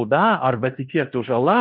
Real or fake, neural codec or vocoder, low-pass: fake; codec, 16 kHz in and 24 kHz out, 0.9 kbps, LongCat-Audio-Codec, fine tuned four codebook decoder; 5.4 kHz